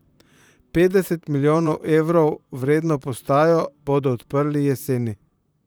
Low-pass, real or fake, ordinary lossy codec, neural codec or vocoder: none; fake; none; vocoder, 44.1 kHz, 128 mel bands, Pupu-Vocoder